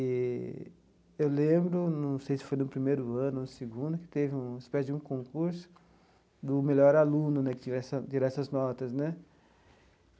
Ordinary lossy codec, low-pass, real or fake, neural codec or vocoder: none; none; real; none